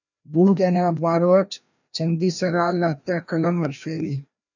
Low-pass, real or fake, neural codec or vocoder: 7.2 kHz; fake; codec, 16 kHz, 1 kbps, FreqCodec, larger model